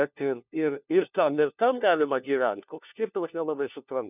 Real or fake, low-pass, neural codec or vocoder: fake; 3.6 kHz; codec, 16 kHz, 1 kbps, FunCodec, trained on LibriTTS, 50 frames a second